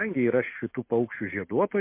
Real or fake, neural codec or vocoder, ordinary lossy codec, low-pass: real; none; MP3, 24 kbps; 3.6 kHz